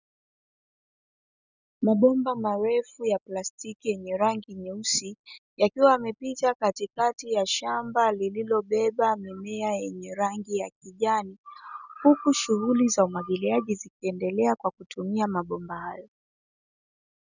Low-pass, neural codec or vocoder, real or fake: 7.2 kHz; none; real